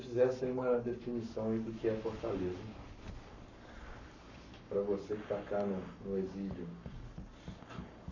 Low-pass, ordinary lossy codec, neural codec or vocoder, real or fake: 7.2 kHz; none; codec, 44.1 kHz, 7.8 kbps, Pupu-Codec; fake